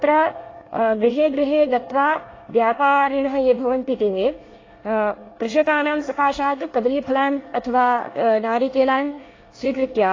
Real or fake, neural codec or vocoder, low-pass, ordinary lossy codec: fake; codec, 24 kHz, 1 kbps, SNAC; 7.2 kHz; AAC, 32 kbps